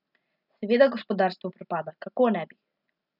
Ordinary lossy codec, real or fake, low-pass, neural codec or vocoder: none; real; 5.4 kHz; none